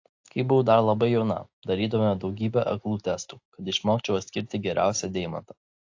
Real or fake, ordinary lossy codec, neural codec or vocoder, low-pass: real; AAC, 48 kbps; none; 7.2 kHz